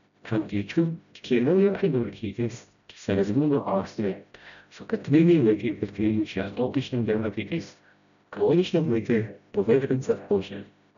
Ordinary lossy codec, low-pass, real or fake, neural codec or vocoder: none; 7.2 kHz; fake; codec, 16 kHz, 0.5 kbps, FreqCodec, smaller model